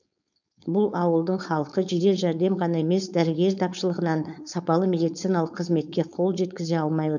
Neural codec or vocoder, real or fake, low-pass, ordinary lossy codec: codec, 16 kHz, 4.8 kbps, FACodec; fake; 7.2 kHz; none